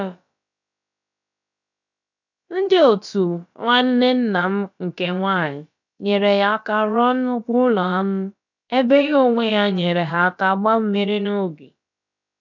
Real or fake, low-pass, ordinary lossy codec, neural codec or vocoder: fake; 7.2 kHz; none; codec, 16 kHz, about 1 kbps, DyCAST, with the encoder's durations